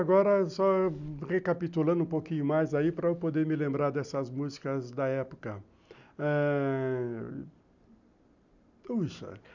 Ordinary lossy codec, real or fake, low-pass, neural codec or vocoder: none; real; 7.2 kHz; none